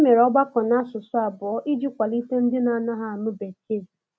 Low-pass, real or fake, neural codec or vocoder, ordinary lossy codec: none; real; none; none